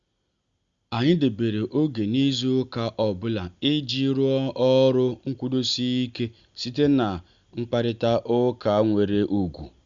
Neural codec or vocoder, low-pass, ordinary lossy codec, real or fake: none; 7.2 kHz; Opus, 64 kbps; real